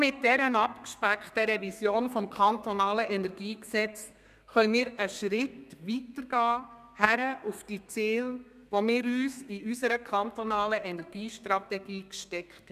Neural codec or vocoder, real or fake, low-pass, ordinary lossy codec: codec, 32 kHz, 1.9 kbps, SNAC; fake; 14.4 kHz; none